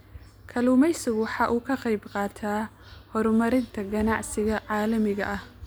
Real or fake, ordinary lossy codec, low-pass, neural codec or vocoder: real; none; none; none